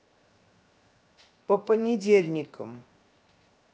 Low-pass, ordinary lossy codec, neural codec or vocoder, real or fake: none; none; codec, 16 kHz, 0.7 kbps, FocalCodec; fake